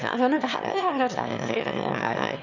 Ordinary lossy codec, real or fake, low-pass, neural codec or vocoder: none; fake; 7.2 kHz; autoencoder, 22.05 kHz, a latent of 192 numbers a frame, VITS, trained on one speaker